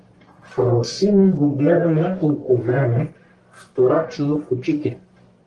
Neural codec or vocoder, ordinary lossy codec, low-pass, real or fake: codec, 44.1 kHz, 1.7 kbps, Pupu-Codec; Opus, 24 kbps; 10.8 kHz; fake